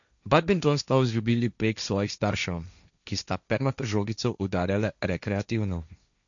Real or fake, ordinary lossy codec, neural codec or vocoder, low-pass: fake; none; codec, 16 kHz, 1.1 kbps, Voila-Tokenizer; 7.2 kHz